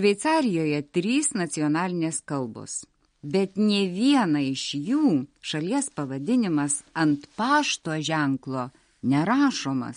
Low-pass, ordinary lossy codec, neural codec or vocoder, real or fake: 9.9 kHz; MP3, 48 kbps; none; real